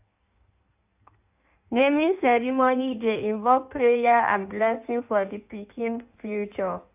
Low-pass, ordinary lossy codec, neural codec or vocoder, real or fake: 3.6 kHz; AAC, 32 kbps; codec, 16 kHz in and 24 kHz out, 1.1 kbps, FireRedTTS-2 codec; fake